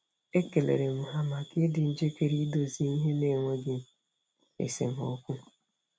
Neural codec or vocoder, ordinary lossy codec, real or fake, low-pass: none; none; real; none